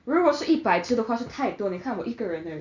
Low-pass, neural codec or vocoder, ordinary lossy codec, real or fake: 7.2 kHz; none; none; real